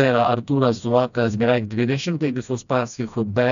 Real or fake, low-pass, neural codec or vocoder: fake; 7.2 kHz; codec, 16 kHz, 1 kbps, FreqCodec, smaller model